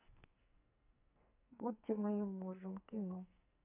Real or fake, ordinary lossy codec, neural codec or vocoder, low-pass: fake; none; codec, 44.1 kHz, 2.6 kbps, SNAC; 3.6 kHz